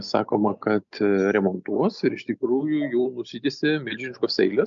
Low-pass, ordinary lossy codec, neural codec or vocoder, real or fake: 7.2 kHz; MP3, 64 kbps; none; real